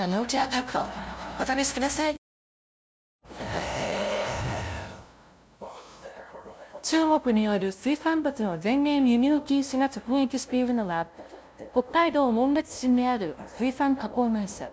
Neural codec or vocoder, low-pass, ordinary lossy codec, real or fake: codec, 16 kHz, 0.5 kbps, FunCodec, trained on LibriTTS, 25 frames a second; none; none; fake